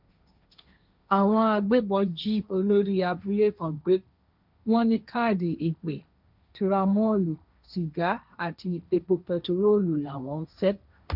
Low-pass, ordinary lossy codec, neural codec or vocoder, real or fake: 5.4 kHz; none; codec, 16 kHz, 1.1 kbps, Voila-Tokenizer; fake